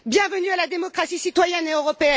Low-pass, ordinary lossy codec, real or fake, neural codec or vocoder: none; none; real; none